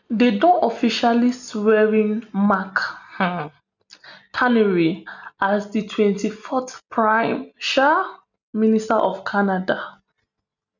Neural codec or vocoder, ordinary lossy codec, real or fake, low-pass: none; none; real; 7.2 kHz